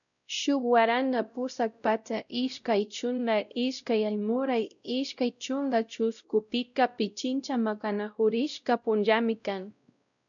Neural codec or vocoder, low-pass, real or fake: codec, 16 kHz, 0.5 kbps, X-Codec, WavLM features, trained on Multilingual LibriSpeech; 7.2 kHz; fake